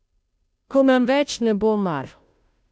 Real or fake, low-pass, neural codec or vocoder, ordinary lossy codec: fake; none; codec, 16 kHz, 0.5 kbps, FunCodec, trained on Chinese and English, 25 frames a second; none